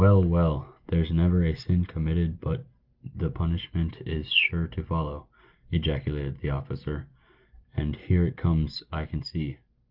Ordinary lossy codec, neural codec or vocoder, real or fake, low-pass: Opus, 32 kbps; none; real; 5.4 kHz